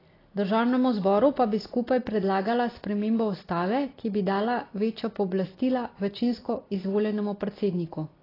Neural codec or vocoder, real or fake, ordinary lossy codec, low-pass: none; real; AAC, 24 kbps; 5.4 kHz